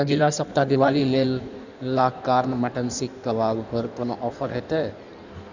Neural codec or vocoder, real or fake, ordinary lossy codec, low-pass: codec, 16 kHz in and 24 kHz out, 1.1 kbps, FireRedTTS-2 codec; fake; none; 7.2 kHz